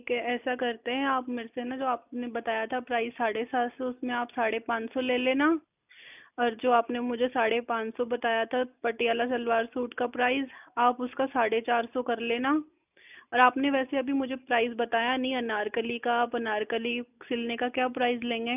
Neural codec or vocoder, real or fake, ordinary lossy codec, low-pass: none; real; none; 3.6 kHz